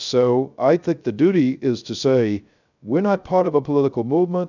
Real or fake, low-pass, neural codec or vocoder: fake; 7.2 kHz; codec, 16 kHz, 0.3 kbps, FocalCodec